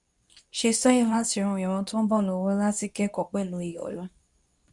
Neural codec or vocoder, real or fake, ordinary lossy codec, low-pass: codec, 24 kHz, 0.9 kbps, WavTokenizer, medium speech release version 2; fake; none; 10.8 kHz